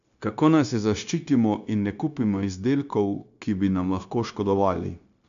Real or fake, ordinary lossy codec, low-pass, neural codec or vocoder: fake; none; 7.2 kHz; codec, 16 kHz, 0.9 kbps, LongCat-Audio-Codec